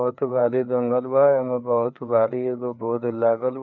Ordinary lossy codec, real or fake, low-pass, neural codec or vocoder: none; fake; 7.2 kHz; codec, 16 kHz, 4 kbps, FreqCodec, larger model